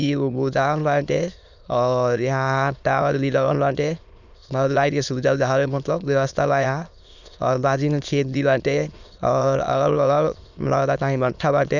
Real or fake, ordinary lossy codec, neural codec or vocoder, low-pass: fake; none; autoencoder, 22.05 kHz, a latent of 192 numbers a frame, VITS, trained on many speakers; 7.2 kHz